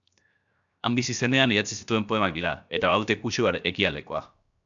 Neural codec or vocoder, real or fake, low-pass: codec, 16 kHz, 0.7 kbps, FocalCodec; fake; 7.2 kHz